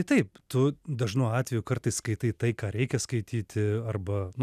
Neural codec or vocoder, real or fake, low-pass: none; real; 14.4 kHz